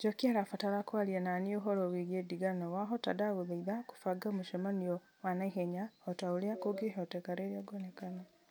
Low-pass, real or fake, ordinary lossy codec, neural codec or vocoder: none; real; none; none